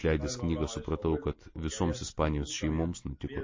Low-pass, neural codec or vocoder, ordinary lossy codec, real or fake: 7.2 kHz; none; MP3, 32 kbps; real